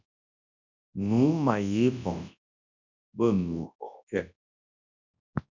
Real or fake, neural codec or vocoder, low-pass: fake; codec, 24 kHz, 0.9 kbps, WavTokenizer, large speech release; 7.2 kHz